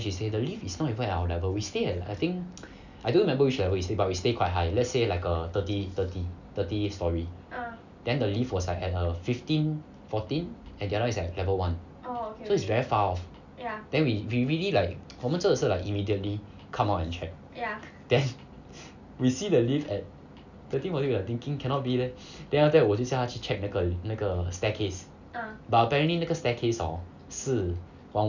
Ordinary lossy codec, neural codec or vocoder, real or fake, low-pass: none; none; real; 7.2 kHz